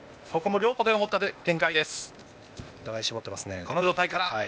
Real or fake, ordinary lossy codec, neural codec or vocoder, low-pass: fake; none; codec, 16 kHz, 0.8 kbps, ZipCodec; none